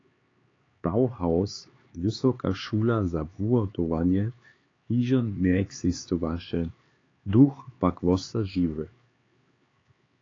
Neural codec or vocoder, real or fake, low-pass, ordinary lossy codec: codec, 16 kHz, 4 kbps, X-Codec, HuBERT features, trained on LibriSpeech; fake; 7.2 kHz; AAC, 32 kbps